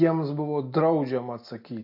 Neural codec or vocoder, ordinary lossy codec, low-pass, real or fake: none; MP3, 32 kbps; 5.4 kHz; real